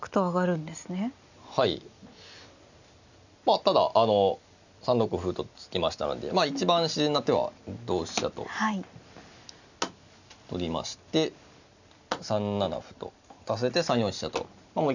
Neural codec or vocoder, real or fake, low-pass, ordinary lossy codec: none; real; 7.2 kHz; none